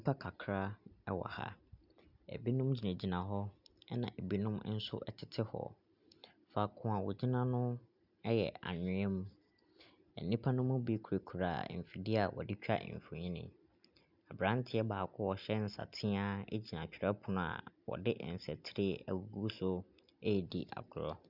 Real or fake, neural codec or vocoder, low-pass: real; none; 5.4 kHz